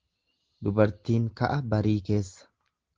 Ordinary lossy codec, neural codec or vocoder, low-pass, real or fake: Opus, 16 kbps; none; 7.2 kHz; real